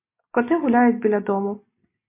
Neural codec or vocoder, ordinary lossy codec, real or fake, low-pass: none; MP3, 24 kbps; real; 3.6 kHz